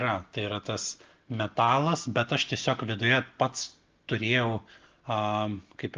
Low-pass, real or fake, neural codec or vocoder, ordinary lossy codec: 7.2 kHz; real; none; Opus, 24 kbps